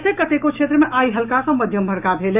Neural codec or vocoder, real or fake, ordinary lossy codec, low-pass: autoencoder, 48 kHz, 128 numbers a frame, DAC-VAE, trained on Japanese speech; fake; none; 3.6 kHz